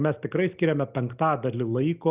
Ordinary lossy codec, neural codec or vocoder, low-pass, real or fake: Opus, 64 kbps; none; 3.6 kHz; real